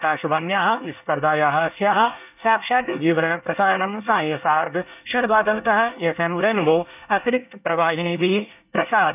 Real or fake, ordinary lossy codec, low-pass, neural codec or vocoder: fake; none; 3.6 kHz; codec, 24 kHz, 1 kbps, SNAC